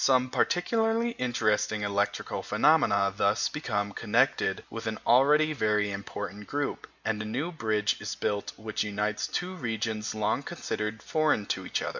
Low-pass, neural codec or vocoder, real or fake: 7.2 kHz; none; real